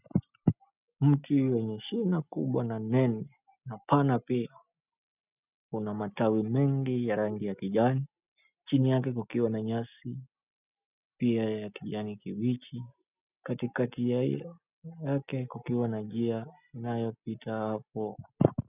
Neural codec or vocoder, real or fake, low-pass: none; real; 3.6 kHz